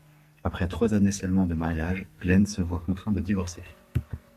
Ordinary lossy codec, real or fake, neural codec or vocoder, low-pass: Opus, 64 kbps; fake; codec, 44.1 kHz, 2.6 kbps, SNAC; 14.4 kHz